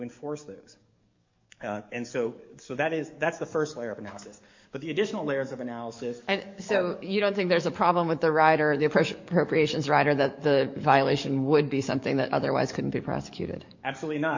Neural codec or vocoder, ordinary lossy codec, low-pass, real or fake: codec, 44.1 kHz, 7.8 kbps, DAC; MP3, 48 kbps; 7.2 kHz; fake